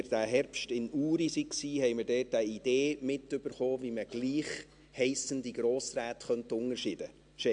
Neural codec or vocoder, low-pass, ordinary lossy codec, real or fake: none; 9.9 kHz; AAC, 64 kbps; real